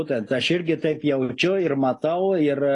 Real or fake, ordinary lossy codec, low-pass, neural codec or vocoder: real; AAC, 32 kbps; 10.8 kHz; none